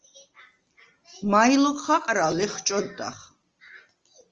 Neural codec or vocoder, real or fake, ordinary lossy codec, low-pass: none; real; Opus, 24 kbps; 7.2 kHz